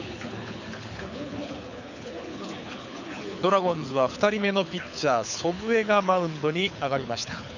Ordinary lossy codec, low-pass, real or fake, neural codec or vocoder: none; 7.2 kHz; fake; codec, 24 kHz, 6 kbps, HILCodec